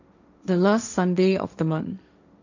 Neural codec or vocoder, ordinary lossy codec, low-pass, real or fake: codec, 16 kHz, 1.1 kbps, Voila-Tokenizer; none; 7.2 kHz; fake